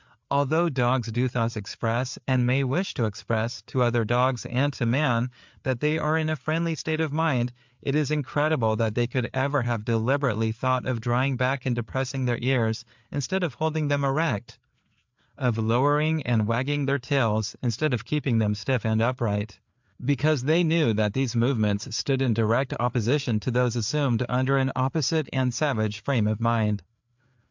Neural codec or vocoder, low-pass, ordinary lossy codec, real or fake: codec, 16 kHz, 4 kbps, FreqCodec, larger model; 7.2 kHz; MP3, 64 kbps; fake